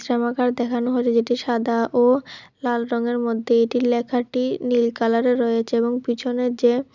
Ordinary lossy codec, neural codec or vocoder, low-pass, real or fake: none; none; 7.2 kHz; real